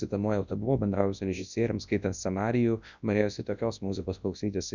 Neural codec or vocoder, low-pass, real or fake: codec, 24 kHz, 0.9 kbps, WavTokenizer, large speech release; 7.2 kHz; fake